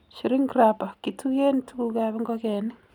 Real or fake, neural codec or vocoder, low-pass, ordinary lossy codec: real; none; 19.8 kHz; none